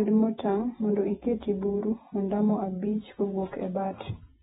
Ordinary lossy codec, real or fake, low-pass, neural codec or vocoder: AAC, 16 kbps; fake; 19.8 kHz; vocoder, 48 kHz, 128 mel bands, Vocos